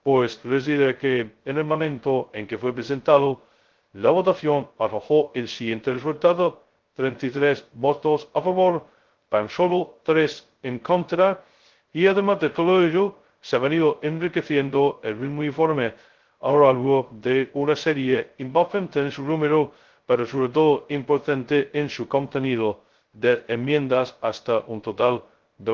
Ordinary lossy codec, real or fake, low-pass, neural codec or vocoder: Opus, 16 kbps; fake; 7.2 kHz; codec, 16 kHz, 0.2 kbps, FocalCodec